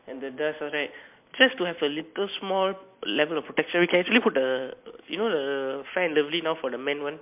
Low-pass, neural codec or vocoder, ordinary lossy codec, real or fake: 3.6 kHz; none; MP3, 32 kbps; real